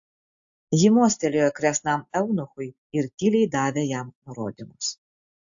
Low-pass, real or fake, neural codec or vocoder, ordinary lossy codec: 7.2 kHz; real; none; AAC, 64 kbps